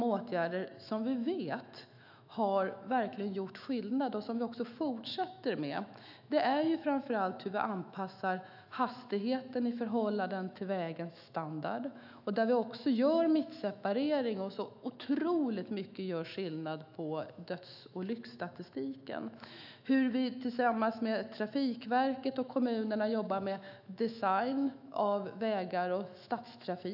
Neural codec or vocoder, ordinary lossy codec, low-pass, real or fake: autoencoder, 48 kHz, 128 numbers a frame, DAC-VAE, trained on Japanese speech; none; 5.4 kHz; fake